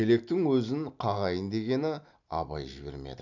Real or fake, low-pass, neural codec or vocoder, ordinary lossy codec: real; 7.2 kHz; none; none